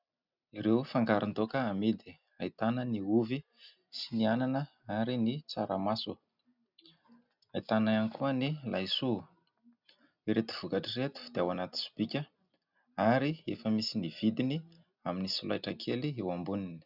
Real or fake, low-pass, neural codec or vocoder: real; 5.4 kHz; none